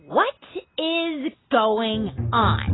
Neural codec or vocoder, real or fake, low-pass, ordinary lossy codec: none; real; 7.2 kHz; AAC, 16 kbps